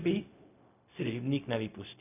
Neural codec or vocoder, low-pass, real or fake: codec, 16 kHz, 0.4 kbps, LongCat-Audio-Codec; 3.6 kHz; fake